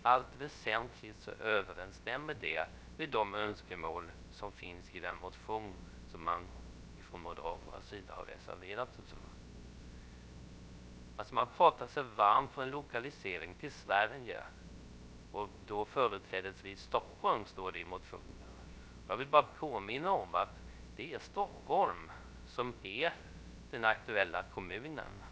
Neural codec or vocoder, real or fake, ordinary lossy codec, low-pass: codec, 16 kHz, 0.3 kbps, FocalCodec; fake; none; none